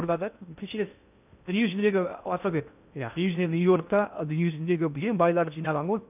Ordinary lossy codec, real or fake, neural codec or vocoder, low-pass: none; fake; codec, 16 kHz in and 24 kHz out, 0.6 kbps, FocalCodec, streaming, 2048 codes; 3.6 kHz